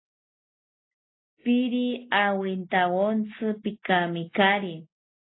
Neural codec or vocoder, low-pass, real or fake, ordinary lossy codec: none; 7.2 kHz; real; AAC, 16 kbps